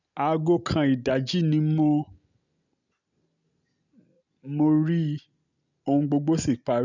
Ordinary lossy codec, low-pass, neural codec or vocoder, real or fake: none; 7.2 kHz; none; real